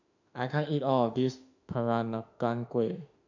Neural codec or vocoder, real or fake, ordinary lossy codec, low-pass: autoencoder, 48 kHz, 32 numbers a frame, DAC-VAE, trained on Japanese speech; fake; none; 7.2 kHz